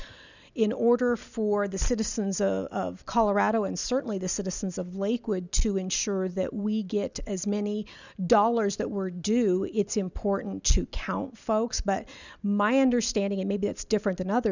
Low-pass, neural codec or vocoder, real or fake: 7.2 kHz; none; real